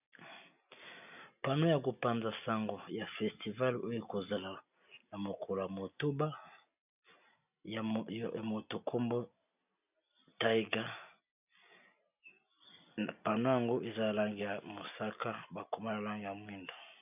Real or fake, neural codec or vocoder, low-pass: real; none; 3.6 kHz